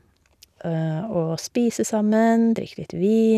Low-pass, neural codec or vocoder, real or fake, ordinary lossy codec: 14.4 kHz; none; real; none